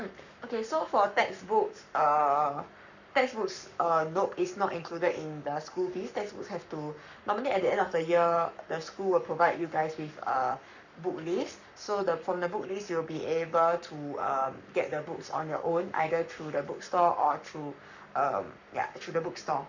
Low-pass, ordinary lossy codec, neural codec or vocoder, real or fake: 7.2 kHz; none; codec, 44.1 kHz, 7.8 kbps, Pupu-Codec; fake